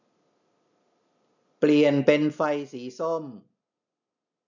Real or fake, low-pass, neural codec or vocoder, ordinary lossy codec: real; 7.2 kHz; none; none